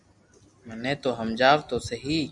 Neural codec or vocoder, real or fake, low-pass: none; real; 10.8 kHz